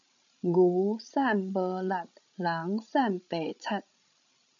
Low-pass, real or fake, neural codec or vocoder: 7.2 kHz; fake; codec, 16 kHz, 16 kbps, FreqCodec, larger model